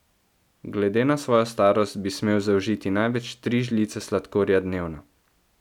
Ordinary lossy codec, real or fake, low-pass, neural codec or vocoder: none; real; 19.8 kHz; none